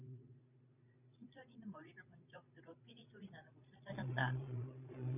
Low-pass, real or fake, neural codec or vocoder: 3.6 kHz; fake; vocoder, 22.05 kHz, 80 mel bands, Vocos